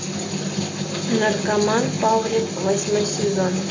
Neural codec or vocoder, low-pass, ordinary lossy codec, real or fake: none; 7.2 kHz; AAC, 32 kbps; real